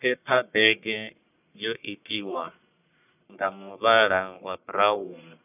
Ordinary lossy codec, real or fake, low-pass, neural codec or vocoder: none; fake; 3.6 kHz; codec, 44.1 kHz, 1.7 kbps, Pupu-Codec